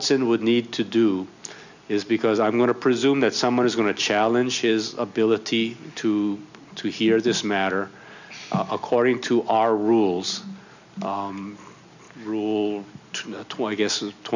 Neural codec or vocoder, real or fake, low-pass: none; real; 7.2 kHz